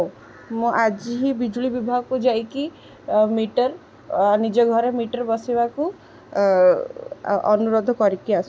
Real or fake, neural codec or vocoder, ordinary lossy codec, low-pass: real; none; none; none